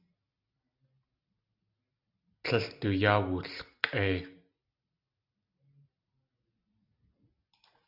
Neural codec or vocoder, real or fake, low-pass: none; real; 5.4 kHz